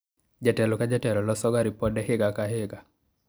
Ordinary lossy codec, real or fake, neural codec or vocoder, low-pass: none; real; none; none